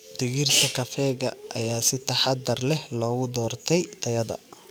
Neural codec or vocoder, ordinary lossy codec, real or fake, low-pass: codec, 44.1 kHz, 7.8 kbps, DAC; none; fake; none